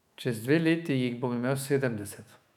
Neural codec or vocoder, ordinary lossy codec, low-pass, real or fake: autoencoder, 48 kHz, 128 numbers a frame, DAC-VAE, trained on Japanese speech; none; 19.8 kHz; fake